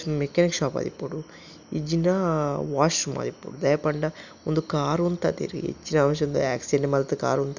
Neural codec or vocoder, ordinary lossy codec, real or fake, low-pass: none; none; real; 7.2 kHz